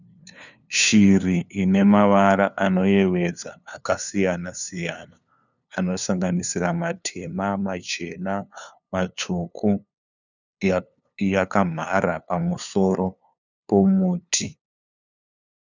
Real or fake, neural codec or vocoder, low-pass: fake; codec, 16 kHz, 2 kbps, FunCodec, trained on LibriTTS, 25 frames a second; 7.2 kHz